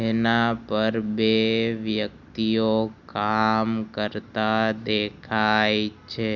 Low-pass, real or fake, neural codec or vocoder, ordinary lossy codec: 7.2 kHz; real; none; none